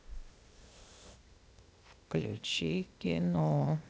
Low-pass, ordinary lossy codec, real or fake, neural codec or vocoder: none; none; fake; codec, 16 kHz, 0.8 kbps, ZipCodec